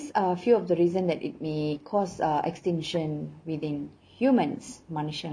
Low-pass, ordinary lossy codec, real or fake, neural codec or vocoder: 9.9 kHz; MP3, 48 kbps; real; none